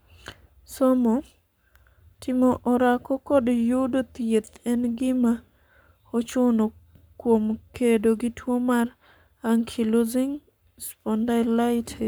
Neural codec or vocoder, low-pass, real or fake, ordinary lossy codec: codec, 44.1 kHz, 7.8 kbps, Pupu-Codec; none; fake; none